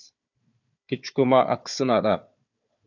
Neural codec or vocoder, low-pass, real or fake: codec, 16 kHz, 4 kbps, FunCodec, trained on Chinese and English, 50 frames a second; 7.2 kHz; fake